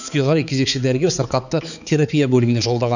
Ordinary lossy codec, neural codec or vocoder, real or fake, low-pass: none; codec, 16 kHz, 4 kbps, X-Codec, HuBERT features, trained on balanced general audio; fake; 7.2 kHz